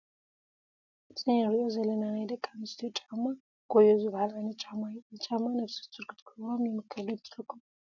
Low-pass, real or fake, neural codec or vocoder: 7.2 kHz; real; none